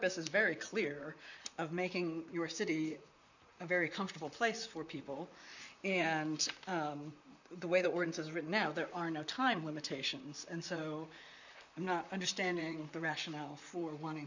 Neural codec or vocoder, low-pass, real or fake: vocoder, 44.1 kHz, 128 mel bands, Pupu-Vocoder; 7.2 kHz; fake